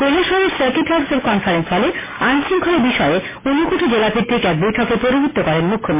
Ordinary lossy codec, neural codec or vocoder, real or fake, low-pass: MP3, 16 kbps; none; real; 3.6 kHz